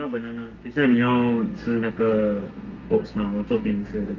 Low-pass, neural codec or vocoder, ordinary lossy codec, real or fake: 7.2 kHz; codec, 32 kHz, 1.9 kbps, SNAC; Opus, 24 kbps; fake